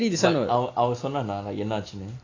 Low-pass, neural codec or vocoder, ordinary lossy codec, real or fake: 7.2 kHz; none; AAC, 32 kbps; real